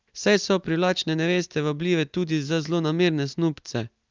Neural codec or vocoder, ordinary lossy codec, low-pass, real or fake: none; Opus, 24 kbps; 7.2 kHz; real